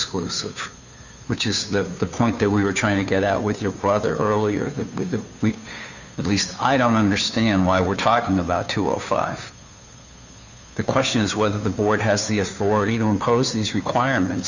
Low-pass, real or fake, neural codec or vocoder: 7.2 kHz; fake; codec, 16 kHz, 2 kbps, FunCodec, trained on Chinese and English, 25 frames a second